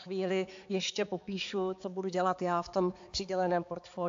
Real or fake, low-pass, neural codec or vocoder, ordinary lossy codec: fake; 7.2 kHz; codec, 16 kHz, 4 kbps, X-Codec, HuBERT features, trained on balanced general audio; AAC, 48 kbps